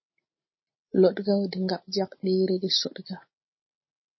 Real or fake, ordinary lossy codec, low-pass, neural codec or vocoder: real; MP3, 24 kbps; 7.2 kHz; none